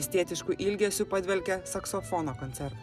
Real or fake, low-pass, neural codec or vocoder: real; 14.4 kHz; none